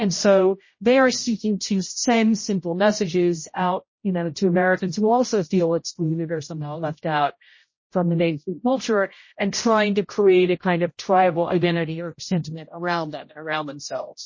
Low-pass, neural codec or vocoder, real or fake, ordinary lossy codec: 7.2 kHz; codec, 16 kHz, 0.5 kbps, X-Codec, HuBERT features, trained on general audio; fake; MP3, 32 kbps